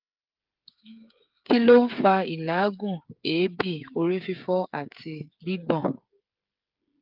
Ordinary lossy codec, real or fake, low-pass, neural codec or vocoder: Opus, 24 kbps; fake; 5.4 kHz; codec, 16 kHz, 8 kbps, FreqCodec, smaller model